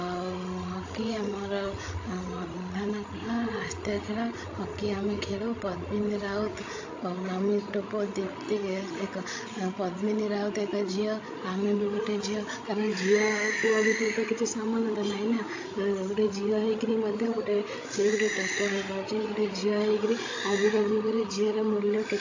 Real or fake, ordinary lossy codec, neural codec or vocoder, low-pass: fake; AAC, 48 kbps; codec, 16 kHz, 16 kbps, FreqCodec, larger model; 7.2 kHz